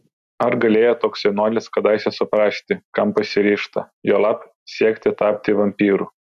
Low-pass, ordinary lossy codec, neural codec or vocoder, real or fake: 14.4 kHz; MP3, 64 kbps; none; real